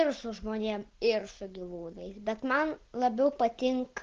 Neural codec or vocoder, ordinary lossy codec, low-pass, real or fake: none; Opus, 16 kbps; 7.2 kHz; real